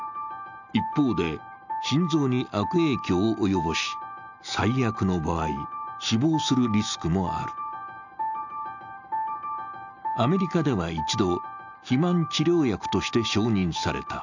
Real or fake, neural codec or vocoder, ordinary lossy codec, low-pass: real; none; none; 7.2 kHz